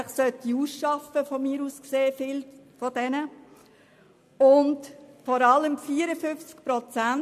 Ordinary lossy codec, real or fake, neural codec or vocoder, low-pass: MP3, 64 kbps; real; none; 14.4 kHz